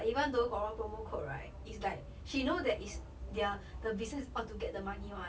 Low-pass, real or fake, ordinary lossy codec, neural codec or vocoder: none; real; none; none